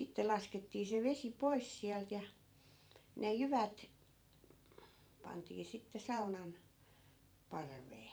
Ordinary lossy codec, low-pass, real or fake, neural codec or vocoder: none; none; fake; vocoder, 44.1 kHz, 128 mel bands every 512 samples, BigVGAN v2